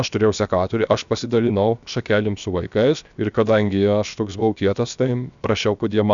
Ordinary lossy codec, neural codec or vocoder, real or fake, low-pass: MP3, 96 kbps; codec, 16 kHz, 0.7 kbps, FocalCodec; fake; 7.2 kHz